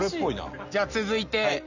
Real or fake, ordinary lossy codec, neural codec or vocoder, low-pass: real; none; none; 7.2 kHz